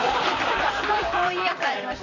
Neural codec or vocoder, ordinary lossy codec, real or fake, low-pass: vocoder, 44.1 kHz, 128 mel bands, Pupu-Vocoder; none; fake; 7.2 kHz